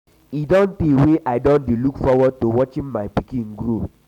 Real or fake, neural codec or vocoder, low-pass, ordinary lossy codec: real; none; 19.8 kHz; none